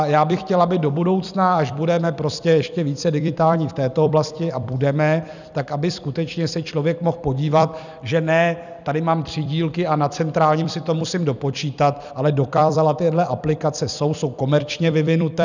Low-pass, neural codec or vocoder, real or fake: 7.2 kHz; vocoder, 44.1 kHz, 128 mel bands every 256 samples, BigVGAN v2; fake